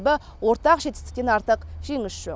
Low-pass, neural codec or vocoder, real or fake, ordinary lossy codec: none; none; real; none